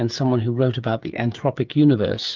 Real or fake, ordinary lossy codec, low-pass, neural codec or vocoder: fake; Opus, 24 kbps; 7.2 kHz; codec, 16 kHz, 8 kbps, FreqCodec, smaller model